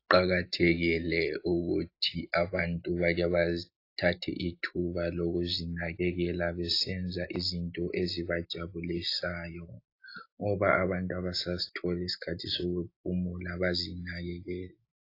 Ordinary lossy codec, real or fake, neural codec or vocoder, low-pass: AAC, 32 kbps; real; none; 5.4 kHz